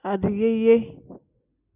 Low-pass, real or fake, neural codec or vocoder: 3.6 kHz; real; none